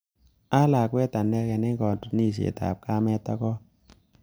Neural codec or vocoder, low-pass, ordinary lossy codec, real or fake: none; none; none; real